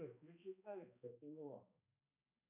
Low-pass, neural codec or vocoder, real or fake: 3.6 kHz; codec, 16 kHz, 2 kbps, X-Codec, HuBERT features, trained on balanced general audio; fake